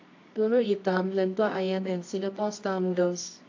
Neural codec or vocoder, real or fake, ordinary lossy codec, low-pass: codec, 24 kHz, 0.9 kbps, WavTokenizer, medium music audio release; fake; AAC, 48 kbps; 7.2 kHz